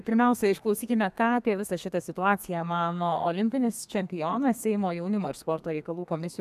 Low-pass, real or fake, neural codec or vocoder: 14.4 kHz; fake; codec, 32 kHz, 1.9 kbps, SNAC